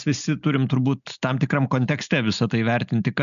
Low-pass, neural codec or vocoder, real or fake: 7.2 kHz; none; real